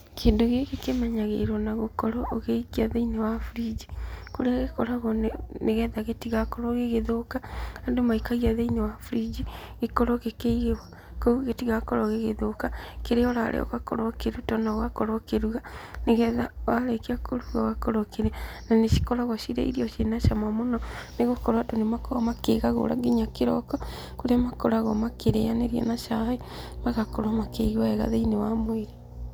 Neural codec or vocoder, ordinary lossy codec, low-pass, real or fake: none; none; none; real